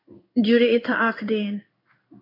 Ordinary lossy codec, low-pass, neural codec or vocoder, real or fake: AAC, 24 kbps; 5.4 kHz; codec, 16 kHz in and 24 kHz out, 1 kbps, XY-Tokenizer; fake